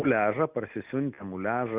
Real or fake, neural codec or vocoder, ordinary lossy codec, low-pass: real; none; Opus, 64 kbps; 3.6 kHz